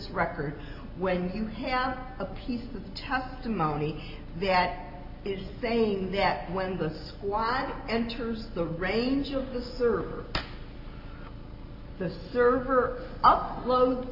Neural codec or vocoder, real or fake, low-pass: none; real; 5.4 kHz